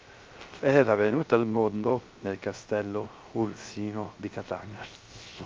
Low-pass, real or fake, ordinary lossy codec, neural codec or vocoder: 7.2 kHz; fake; Opus, 24 kbps; codec, 16 kHz, 0.3 kbps, FocalCodec